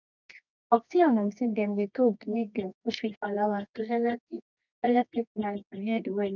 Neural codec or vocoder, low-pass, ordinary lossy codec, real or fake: codec, 24 kHz, 0.9 kbps, WavTokenizer, medium music audio release; 7.2 kHz; none; fake